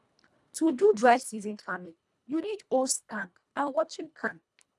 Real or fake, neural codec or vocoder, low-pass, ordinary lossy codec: fake; codec, 24 kHz, 1.5 kbps, HILCodec; none; none